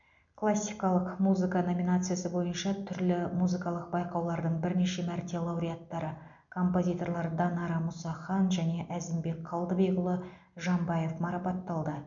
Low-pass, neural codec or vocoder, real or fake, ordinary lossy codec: 7.2 kHz; none; real; MP3, 64 kbps